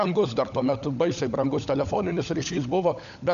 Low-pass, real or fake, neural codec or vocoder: 7.2 kHz; fake; codec, 16 kHz, 16 kbps, FunCodec, trained on LibriTTS, 50 frames a second